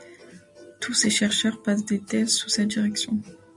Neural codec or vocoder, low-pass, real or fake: none; 10.8 kHz; real